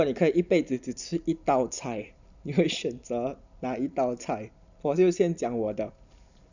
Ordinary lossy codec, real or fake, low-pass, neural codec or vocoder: none; real; 7.2 kHz; none